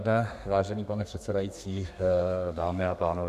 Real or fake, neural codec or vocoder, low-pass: fake; codec, 32 kHz, 1.9 kbps, SNAC; 14.4 kHz